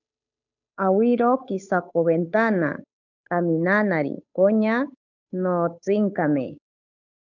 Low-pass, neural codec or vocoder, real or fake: 7.2 kHz; codec, 16 kHz, 8 kbps, FunCodec, trained on Chinese and English, 25 frames a second; fake